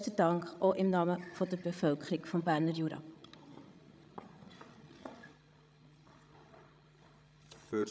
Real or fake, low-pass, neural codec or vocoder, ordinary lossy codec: fake; none; codec, 16 kHz, 16 kbps, FreqCodec, larger model; none